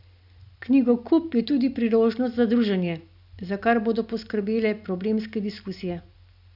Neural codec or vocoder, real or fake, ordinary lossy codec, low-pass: none; real; none; 5.4 kHz